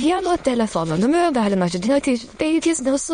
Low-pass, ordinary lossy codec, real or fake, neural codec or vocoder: 9.9 kHz; MP3, 48 kbps; fake; autoencoder, 22.05 kHz, a latent of 192 numbers a frame, VITS, trained on many speakers